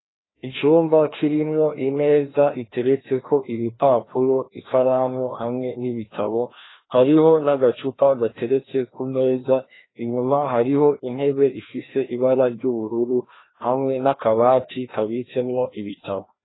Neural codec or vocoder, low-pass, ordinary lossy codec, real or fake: codec, 16 kHz, 1 kbps, FreqCodec, larger model; 7.2 kHz; AAC, 16 kbps; fake